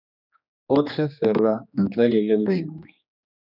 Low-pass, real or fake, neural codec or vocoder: 5.4 kHz; fake; codec, 16 kHz, 2 kbps, X-Codec, HuBERT features, trained on general audio